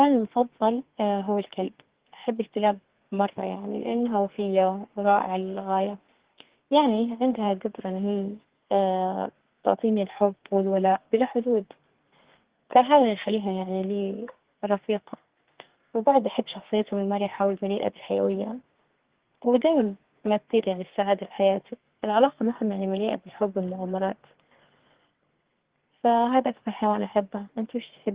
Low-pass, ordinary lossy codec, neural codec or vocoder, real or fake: 3.6 kHz; Opus, 16 kbps; codec, 32 kHz, 1.9 kbps, SNAC; fake